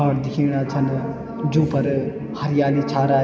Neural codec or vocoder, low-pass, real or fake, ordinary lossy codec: none; none; real; none